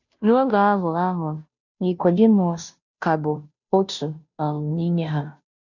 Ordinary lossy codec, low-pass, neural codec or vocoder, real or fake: none; 7.2 kHz; codec, 16 kHz, 0.5 kbps, FunCodec, trained on Chinese and English, 25 frames a second; fake